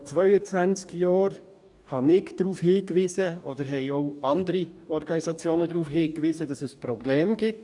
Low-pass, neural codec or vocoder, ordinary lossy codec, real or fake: 10.8 kHz; codec, 44.1 kHz, 2.6 kbps, DAC; none; fake